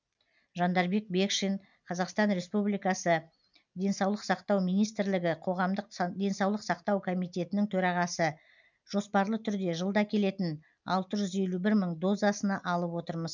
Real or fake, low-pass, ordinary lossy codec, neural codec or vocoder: real; 7.2 kHz; none; none